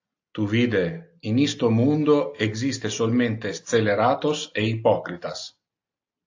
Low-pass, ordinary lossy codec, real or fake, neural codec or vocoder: 7.2 kHz; AAC, 48 kbps; real; none